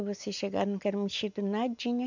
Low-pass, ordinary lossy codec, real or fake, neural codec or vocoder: 7.2 kHz; MP3, 64 kbps; real; none